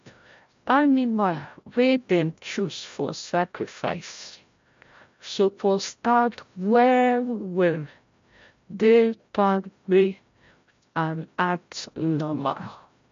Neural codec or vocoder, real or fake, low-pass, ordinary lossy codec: codec, 16 kHz, 0.5 kbps, FreqCodec, larger model; fake; 7.2 kHz; MP3, 48 kbps